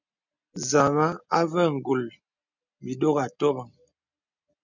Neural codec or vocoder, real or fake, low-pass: none; real; 7.2 kHz